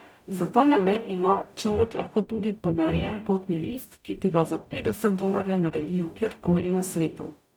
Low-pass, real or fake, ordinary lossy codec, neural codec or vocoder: none; fake; none; codec, 44.1 kHz, 0.9 kbps, DAC